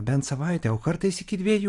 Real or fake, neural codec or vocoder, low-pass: fake; vocoder, 44.1 kHz, 128 mel bands every 256 samples, BigVGAN v2; 10.8 kHz